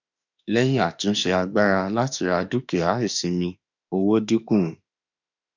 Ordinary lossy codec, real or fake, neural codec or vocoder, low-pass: none; fake; autoencoder, 48 kHz, 32 numbers a frame, DAC-VAE, trained on Japanese speech; 7.2 kHz